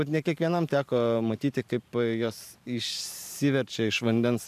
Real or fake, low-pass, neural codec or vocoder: fake; 14.4 kHz; vocoder, 44.1 kHz, 128 mel bands every 512 samples, BigVGAN v2